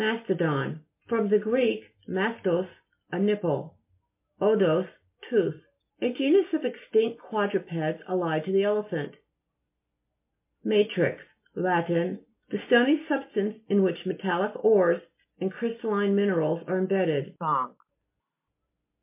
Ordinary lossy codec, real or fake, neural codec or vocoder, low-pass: MP3, 24 kbps; real; none; 3.6 kHz